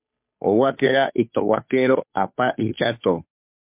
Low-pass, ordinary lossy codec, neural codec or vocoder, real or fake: 3.6 kHz; MP3, 32 kbps; codec, 16 kHz, 2 kbps, FunCodec, trained on Chinese and English, 25 frames a second; fake